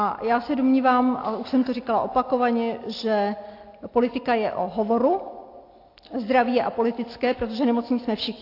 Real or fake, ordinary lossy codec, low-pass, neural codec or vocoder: real; AAC, 32 kbps; 5.4 kHz; none